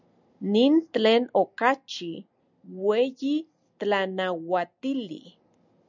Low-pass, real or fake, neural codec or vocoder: 7.2 kHz; real; none